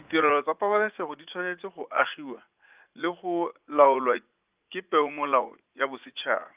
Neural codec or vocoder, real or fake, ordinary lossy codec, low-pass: vocoder, 44.1 kHz, 80 mel bands, Vocos; fake; Opus, 24 kbps; 3.6 kHz